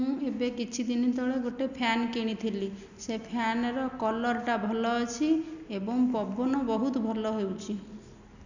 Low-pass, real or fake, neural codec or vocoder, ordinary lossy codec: 7.2 kHz; real; none; none